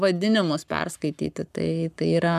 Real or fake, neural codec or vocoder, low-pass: fake; codec, 44.1 kHz, 7.8 kbps, Pupu-Codec; 14.4 kHz